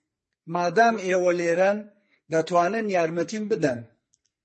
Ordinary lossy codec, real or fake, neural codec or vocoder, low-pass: MP3, 32 kbps; fake; codec, 44.1 kHz, 2.6 kbps, SNAC; 10.8 kHz